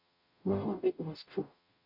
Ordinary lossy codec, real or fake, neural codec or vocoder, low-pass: none; fake; codec, 44.1 kHz, 0.9 kbps, DAC; 5.4 kHz